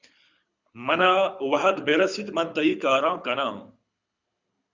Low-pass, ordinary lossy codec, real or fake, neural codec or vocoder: 7.2 kHz; Opus, 64 kbps; fake; codec, 24 kHz, 6 kbps, HILCodec